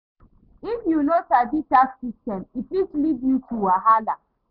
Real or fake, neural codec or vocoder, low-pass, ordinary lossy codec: real; none; 5.4 kHz; none